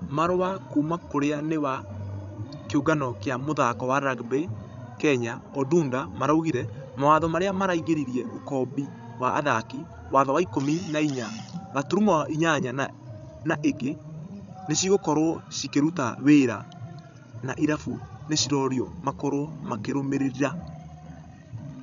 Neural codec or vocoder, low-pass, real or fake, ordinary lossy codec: codec, 16 kHz, 16 kbps, FreqCodec, larger model; 7.2 kHz; fake; MP3, 96 kbps